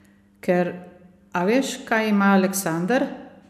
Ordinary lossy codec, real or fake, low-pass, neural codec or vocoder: none; real; 14.4 kHz; none